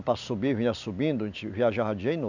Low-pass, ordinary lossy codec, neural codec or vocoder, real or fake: 7.2 kHz; none; none; real